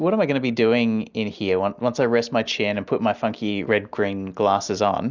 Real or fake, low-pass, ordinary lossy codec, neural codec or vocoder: real; 7.2 kHz; Opus, 64 kbps; none